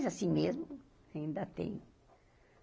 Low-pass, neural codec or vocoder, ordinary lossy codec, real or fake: none; none; none; real